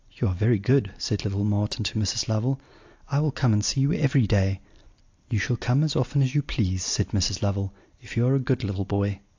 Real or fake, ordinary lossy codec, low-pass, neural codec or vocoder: real; AAC, 48 kbps; 7.2 kHz; none